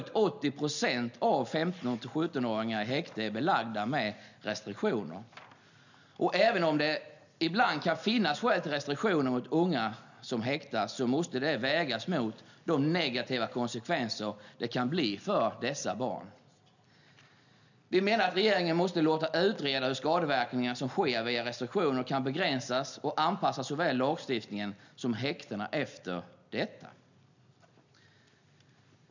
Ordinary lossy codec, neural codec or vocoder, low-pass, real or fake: none; none; 7.2 kHz; real